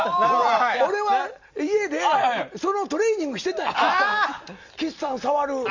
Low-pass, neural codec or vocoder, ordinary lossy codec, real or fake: 7.2 kHz; none; Opus, 64 kbps; real